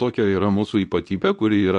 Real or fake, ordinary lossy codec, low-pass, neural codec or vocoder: fake; AAC, 64 kbps; 10.8 kHz; codec, 24 kHz, 0.9 kbps, WavTokenizer, medium speech release version 2